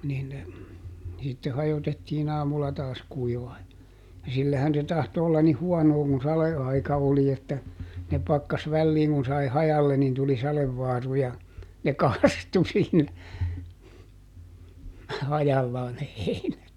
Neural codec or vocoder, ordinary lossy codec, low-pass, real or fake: none; none; 19.8 kHz; real